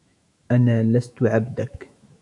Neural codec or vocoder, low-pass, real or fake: autoencoder, 48 kHz, 128 numbers a frame, DAC-VAE, trained on Japanese speech; 10.8 kHz; fake